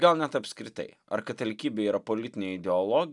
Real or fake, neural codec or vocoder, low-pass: real; none; 10.8 kHz